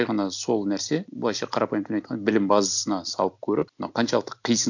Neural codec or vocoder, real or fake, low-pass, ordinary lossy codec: none; real; 7.2 kHz; none